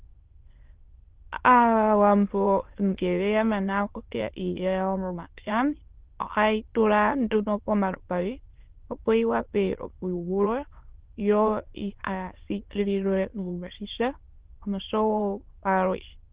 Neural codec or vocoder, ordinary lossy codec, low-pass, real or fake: autoencoder, 22.05 kHz, a latent of 192 numbers a frame, VITS, trained on many speakers; Opus, 16 kbps; 3.6 kHz; fake